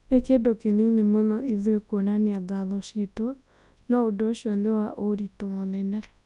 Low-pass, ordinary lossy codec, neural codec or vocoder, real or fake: 10.8 kHz; none; codec, 24 kHz, 0.9 kbps, WavTokenizer, large speech release; fake